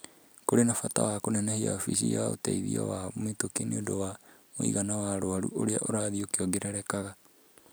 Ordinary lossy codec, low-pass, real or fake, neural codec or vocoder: none; none; real; none